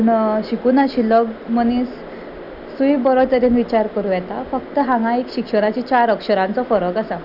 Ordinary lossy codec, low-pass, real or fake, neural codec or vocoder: none; 5.4 kHz; real; none